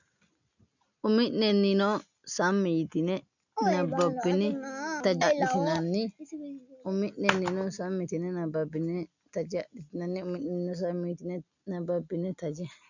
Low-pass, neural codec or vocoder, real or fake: 7.2 kHz; none; real